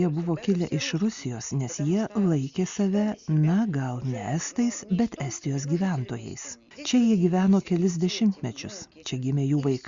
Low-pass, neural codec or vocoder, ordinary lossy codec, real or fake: 7.2 kHz; none; Opus, 64 kbps; real